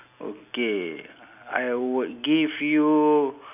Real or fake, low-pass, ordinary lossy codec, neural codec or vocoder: real; 3.6 kHz; none; none